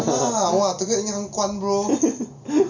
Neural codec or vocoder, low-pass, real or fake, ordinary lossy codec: none; 7.2 kHz; real; none